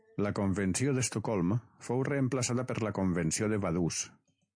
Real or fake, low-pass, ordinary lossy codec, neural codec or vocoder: real; 9.9 kHz; MP3, 48 kbps; none